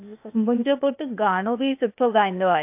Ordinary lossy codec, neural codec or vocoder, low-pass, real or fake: AAC, 32 kbps; codec, 16 kHz, 0.8 kbps, ZipCodec; 3.6 kHz; fake